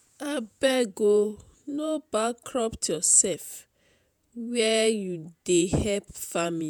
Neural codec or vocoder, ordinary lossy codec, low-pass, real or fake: vocoder, 48 kHz, 128 mel bands, Vocos; none; none; fake